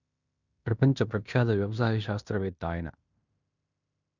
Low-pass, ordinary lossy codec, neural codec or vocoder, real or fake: 7.2 kHz; none; codec, 16 kHz in and 24 kHz out, 0.9 kbps, LongCat-Audio-Codec, fine tuned four codebook decoder; fake